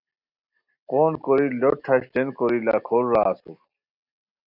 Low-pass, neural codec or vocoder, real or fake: 5.4 kHz; none; real